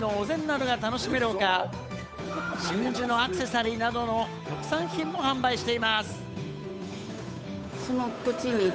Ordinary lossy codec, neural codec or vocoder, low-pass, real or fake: none; codec, 16 kHz, 8 kbps, FunCodec, trained on Chinese and English, 25 frames a second; none; fake